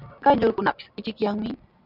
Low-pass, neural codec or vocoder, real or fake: 5.4 kHz; none; real